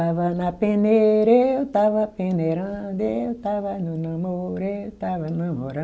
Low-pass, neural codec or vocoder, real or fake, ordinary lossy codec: none; none; real; none